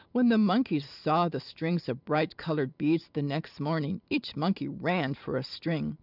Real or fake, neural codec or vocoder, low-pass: fake; vocoder, 44.1 kHz, 128 mel bands every 512 samples, BigVGAN v2; 5.4 kHz